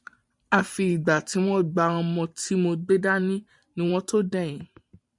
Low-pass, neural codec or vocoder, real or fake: 10.8 kHz; vocoder, 44.1 kHz, 128 mel bands every 512 samples, BigVGAN v2; fake